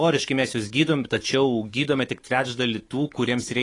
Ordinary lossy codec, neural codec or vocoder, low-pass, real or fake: AAC, 32 kbps; codec, 24 kHz, 3.1 kbps, DualCodec; 10.8 kHz; fake